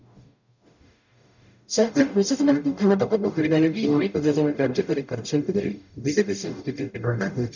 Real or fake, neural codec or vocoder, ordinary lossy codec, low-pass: fake; codec, 44.1 kHz, 0.9 kbps, DAC; none; 7.2 kHz